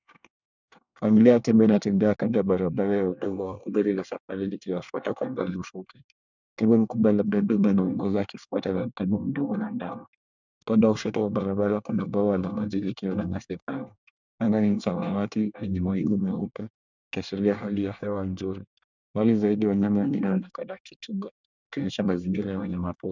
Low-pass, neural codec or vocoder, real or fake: 7.2 kHz; codec, 24 kHz, 1 kbps, SNAC; fake